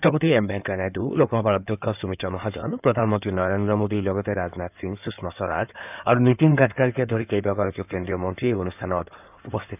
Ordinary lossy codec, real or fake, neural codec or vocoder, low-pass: none; fake; codec, 16 kHz in and 24 kHz out, 2.2 kbps, FireRedTTS-2 codec; 3.6 kHz